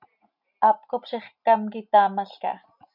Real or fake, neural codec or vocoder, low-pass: real; none; 5.4 kHz